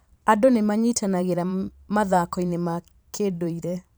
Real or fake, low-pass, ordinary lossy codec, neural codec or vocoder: fake; none; none; vocoder, 44.1 kHz, 128 mel bands every 512 samples, BigVGAN v2